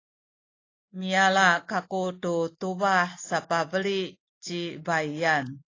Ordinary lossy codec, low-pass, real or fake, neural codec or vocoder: AAC, 32 kbps; 7.2 kHz; real; none